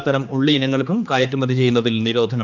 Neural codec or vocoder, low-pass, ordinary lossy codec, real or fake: codec, 16 kHz, 2 kbps, X-Codec, HuBERT features, trained on general audio; 7.2 kHz; none; fake